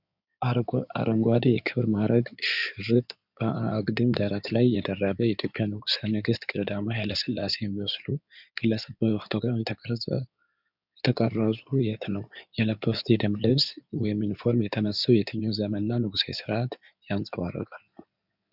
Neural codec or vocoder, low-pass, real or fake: codec, 16 kHz in and 24 kHz out, 2.2 kbps, FireRedTTS-2 codec; 5.4 kHz; fake